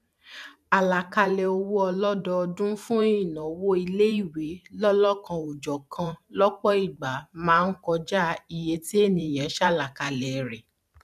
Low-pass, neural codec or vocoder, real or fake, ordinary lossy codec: 14.4 kHz; vocoder, 44.1 kHz, 128 mel bands every 512 samples, BigVGAN v2; fake; none